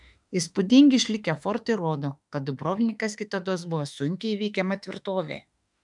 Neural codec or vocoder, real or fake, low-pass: autoencoder, 48 kHz, 32 numbers a frame, DAC-VAE, trained on Japanese speech; fake; 10.8 kHz